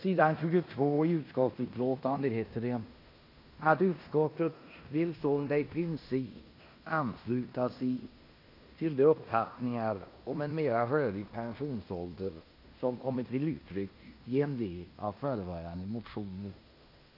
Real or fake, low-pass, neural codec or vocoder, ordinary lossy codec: fake; 5.4 kHz; codec, 16 kHz in and 24 kHz out, 0.9 kbps, LongCat-Audio-Codec, fine tuned four codebook decoder; AAC, 32 kbps